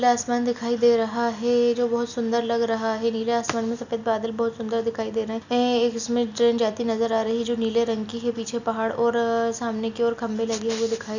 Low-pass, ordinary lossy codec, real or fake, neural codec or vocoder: 7.2 kHz; none; real; none